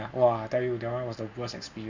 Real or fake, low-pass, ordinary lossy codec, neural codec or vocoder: real; 7.2 kHz; none; none